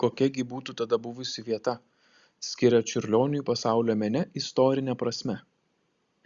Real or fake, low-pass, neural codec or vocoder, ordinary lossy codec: real; 7.2 kHz; none; Opus, 64 kbps